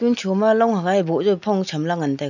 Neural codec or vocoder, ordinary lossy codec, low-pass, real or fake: none; none; 7.2 kHz; real